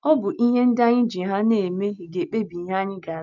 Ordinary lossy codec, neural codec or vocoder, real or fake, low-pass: none; none; real; 7.2 kHz